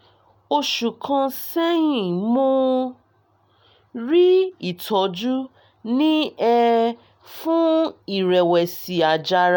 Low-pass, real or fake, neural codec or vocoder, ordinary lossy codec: none; real; none; none